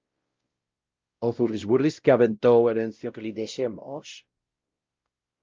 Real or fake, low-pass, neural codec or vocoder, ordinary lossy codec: fake; 7.2 kHz; codec, 16 kHz, 0.5 kbps, X-Codec, WavLM features, trained on Multilingual LibriSpeech; Opus, 24 kbps